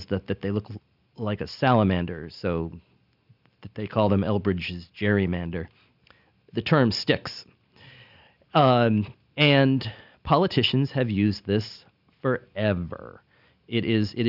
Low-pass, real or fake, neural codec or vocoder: 5.4 kHz; real; none